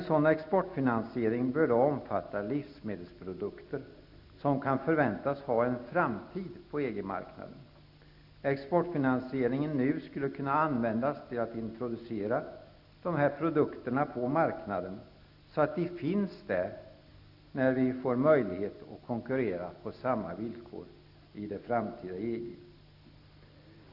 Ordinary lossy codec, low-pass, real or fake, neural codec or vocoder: none; 5.4 kHz; real; none